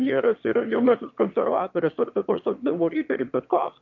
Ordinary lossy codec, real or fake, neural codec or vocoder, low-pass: MP3, 48 kbps; fake; autoencoder, 22.05 kHz, a latent of 192 numbers a frame, VITS, trained on one speaker; 7.2 kHz